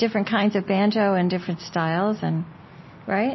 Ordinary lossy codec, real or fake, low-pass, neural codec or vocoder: MP3, 24 kbps; real; 7.2 kHz; none